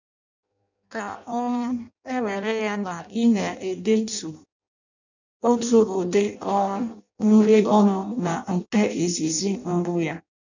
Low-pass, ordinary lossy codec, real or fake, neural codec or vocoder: 7.2 kHz; none; fake; codec, 16 kHz in and 24 kHz out, 0.6 kbps, FireRedTTS-2 codec